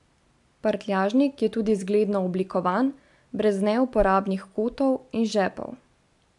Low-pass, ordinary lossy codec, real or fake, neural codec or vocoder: 10.8 kHz; AAC, 64 kbps; real; none